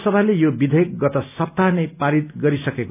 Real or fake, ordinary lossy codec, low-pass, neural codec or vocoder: real; none; 3.6 kHz; none